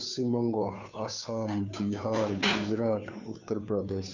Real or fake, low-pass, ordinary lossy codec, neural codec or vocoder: fake; 7.2 kHz; none; codec, 16 kHz, 2 kbps, FunCodec, trained on Chinese and English, 25 frames a second